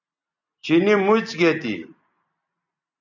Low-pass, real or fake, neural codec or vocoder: 7.2 kHz; real; none